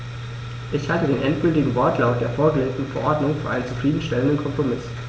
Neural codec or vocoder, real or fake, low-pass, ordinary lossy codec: none; real; none; none